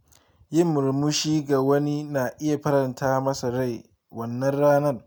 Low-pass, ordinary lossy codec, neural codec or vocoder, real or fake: none; none; none; real